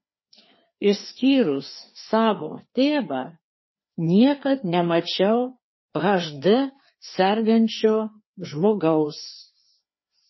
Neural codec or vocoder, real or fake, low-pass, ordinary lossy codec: codec, 16 kHz, 2 kbps, FreqCodec, larger model; fake; 7.2 kHz; MP3, 24 kbps